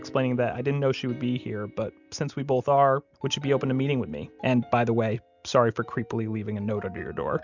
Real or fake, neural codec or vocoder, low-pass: real; none; 7.2 kHz